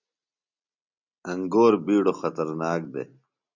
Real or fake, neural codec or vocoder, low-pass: real; none; 7.2 kHz